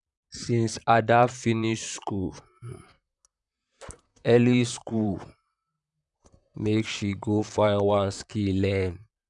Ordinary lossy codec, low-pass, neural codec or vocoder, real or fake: none; 10.8 kHz; vocoder, 44.1 kHz, 128 mel bands, Pupu-Vocoder; fake